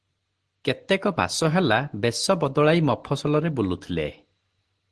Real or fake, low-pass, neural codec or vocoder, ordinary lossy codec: real; 10.8 kHz; none; Opus, 16 kbps